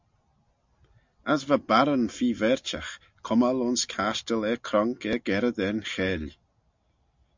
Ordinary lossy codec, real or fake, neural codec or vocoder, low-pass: MP3, 64 kbps; real; none; 7.2 kHz